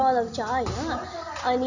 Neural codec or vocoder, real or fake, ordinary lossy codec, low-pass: none; real; none; 7.2 kHz